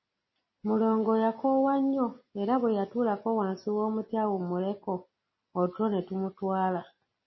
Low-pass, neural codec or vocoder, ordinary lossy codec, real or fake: 7.2 kHz; none; MP3, 24 kbps; real